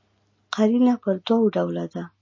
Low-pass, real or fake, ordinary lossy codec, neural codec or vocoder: 7.2 kHz; real; MP3, 32 kbps; none